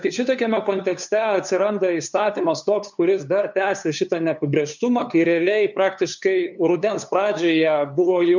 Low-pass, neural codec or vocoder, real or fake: 7.2 kHz; codec, 16 kHz, 2 kbps, FunCodec, trained on LibriTTS, 25 frames a second; fake